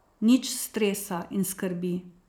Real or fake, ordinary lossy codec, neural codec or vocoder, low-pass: fake; none; vocoder, 44.1 kHz, 128 mel bands every 256 samples, BigVGAN v2; none